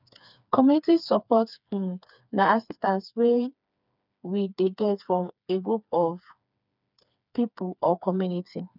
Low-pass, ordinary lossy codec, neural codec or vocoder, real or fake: 5.4 kHz; none; codec, 16 kHz, 4 kbps, FreqCodec, smaller model; fake